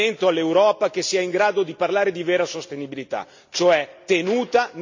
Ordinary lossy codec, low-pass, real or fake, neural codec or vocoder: MP3, 64 kbps; 7.2 kHz; real; none